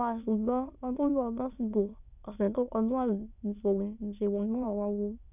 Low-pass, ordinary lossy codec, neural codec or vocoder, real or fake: 3.6 kHz; none; autoencoder, 22.05 kHz, a latent of 192 numbers a frame, VITS, trained on many speakers; fake